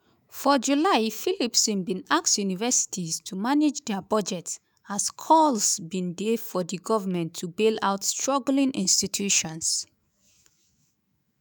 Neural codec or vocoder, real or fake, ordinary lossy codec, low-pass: autoencoder, 48 kHz, 128 numbers a frame, DAC-VAE, trained on Japanese speech; fake; none; none